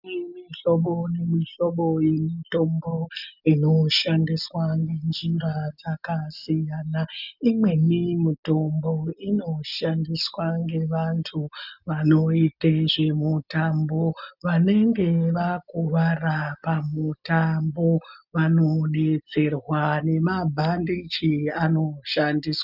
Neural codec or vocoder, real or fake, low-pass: none; real; 5.4 kHz